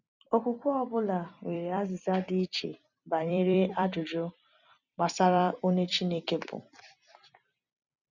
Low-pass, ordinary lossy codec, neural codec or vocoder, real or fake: 7.2 kHz; none; vocoder, 44.1 kHz, 128 mel bands every 256 samples, BigVGAN v2; fake